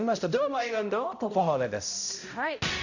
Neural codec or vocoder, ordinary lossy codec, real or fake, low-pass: codec, 16 kHz, 0.5 kbps, X-Codec, HuBERT features, trained on balanced general audio; none; fake; 7.2 kHz